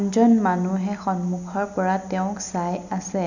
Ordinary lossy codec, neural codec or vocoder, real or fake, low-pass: none; none; real; 7.2 kHz